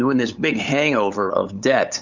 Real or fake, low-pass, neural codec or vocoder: fake; 7.2 kHz; codec, 16 kHz, 8 kbps, FunCodec, trained on LibriTTS, 25 frames a second